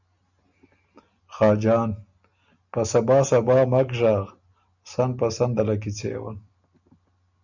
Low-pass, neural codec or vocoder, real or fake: 7.2 kHz; none; real